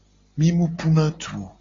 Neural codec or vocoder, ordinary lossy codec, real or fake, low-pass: none; AAC, 32 kbps; real; 7.2 kHz